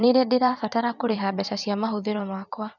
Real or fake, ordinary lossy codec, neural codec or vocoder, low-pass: fake; none; vocoder, 44.1 kHz, 128 mel bands every 512 samples, BigVGAN v2; 7.2 kHz